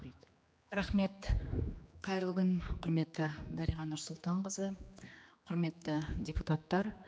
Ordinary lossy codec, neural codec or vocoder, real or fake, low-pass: none; codec, 16 kHz, 2 kbps, X-Codec, HuBERT features, trained on general audio; fake; none